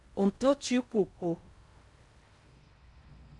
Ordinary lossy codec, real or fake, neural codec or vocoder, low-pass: MP3, 96 kbps; fake; codec, 16 kHz in and 24 kHz out, 0.6 kbps, FocalCodec, streaming, 4096 codes; 10.8 kHz